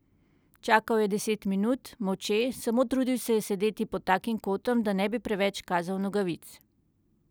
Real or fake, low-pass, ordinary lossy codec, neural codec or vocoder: real; none; none; none